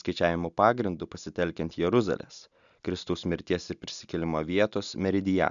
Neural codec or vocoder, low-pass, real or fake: none; 7.2 kHz; real